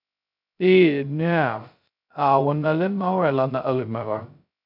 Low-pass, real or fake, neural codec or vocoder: 5.4 kHz; fake; codec, 16 kHz, 0.2 kbps, FocalCodec